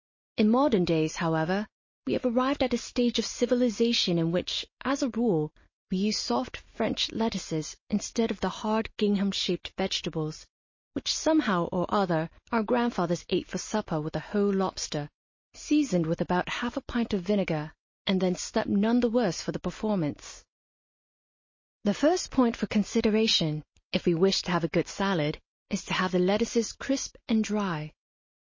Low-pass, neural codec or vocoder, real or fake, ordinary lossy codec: 7.2 kHz; none; real; MP3, 32 kbps